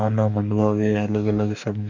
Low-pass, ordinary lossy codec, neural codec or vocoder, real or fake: 7.2 kHz; none; codec, 44.1 kHz, 2.6 kbps, DAC; fake